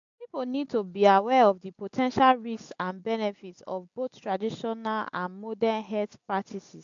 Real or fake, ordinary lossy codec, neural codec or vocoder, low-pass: real; AAC, 64 kbps; none; 7.2 kHz